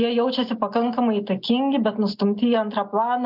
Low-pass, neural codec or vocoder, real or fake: 5.4 kHz; none; real